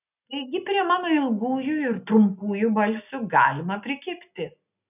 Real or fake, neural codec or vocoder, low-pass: real; none; 3.6 kHz